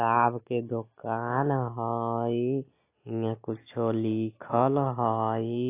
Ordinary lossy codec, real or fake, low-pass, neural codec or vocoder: AAC, 24 kbps; real; 3.6 kHz; none